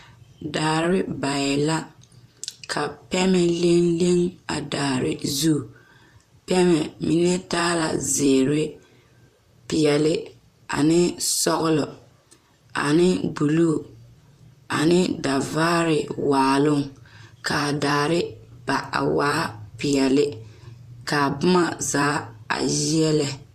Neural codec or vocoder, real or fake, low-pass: vocoder, 44.1 kHz, 128 mel bands, Pupu-Vocoder; fake; 14.4 kHz